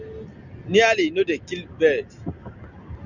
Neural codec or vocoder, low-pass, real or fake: none; 7.2 kHz; real